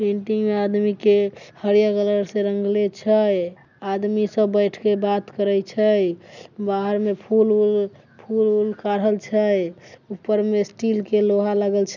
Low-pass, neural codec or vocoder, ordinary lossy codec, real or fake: 7.2 kHz; none; none; real